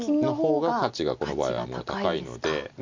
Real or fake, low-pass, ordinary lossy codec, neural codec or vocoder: real; 7.2 kHz; MP3, 48 kbps; none